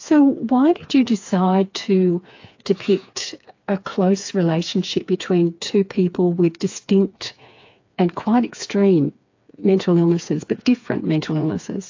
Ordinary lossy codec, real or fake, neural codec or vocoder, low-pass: AAC, 48 kbps; fake; codec, 16 kHz, 4 kbps, FreqCodec, smaller model; 7.2 kHz